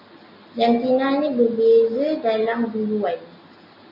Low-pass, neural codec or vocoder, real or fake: 5.4 kHz; none; real